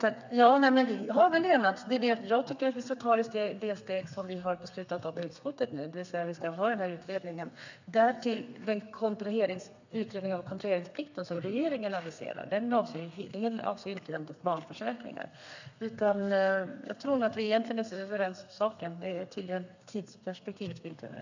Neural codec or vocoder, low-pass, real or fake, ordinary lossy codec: codec, 32 kHz, 1.9 kbps, SNAC; 7.2 kHz; fake; none